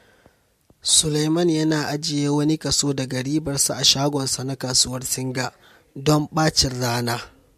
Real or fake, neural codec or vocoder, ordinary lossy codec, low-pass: real; none; MP3, 64 kbps; 14.4 kHz